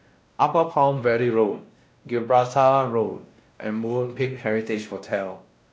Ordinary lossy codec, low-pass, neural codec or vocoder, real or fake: none; none; codec, 16 kHz, 1 kbps, X-Codec, WavLM features, trained on Multilingual LibriSpeech; fake